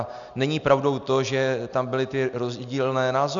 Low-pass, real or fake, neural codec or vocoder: 7.2 kHz; real; none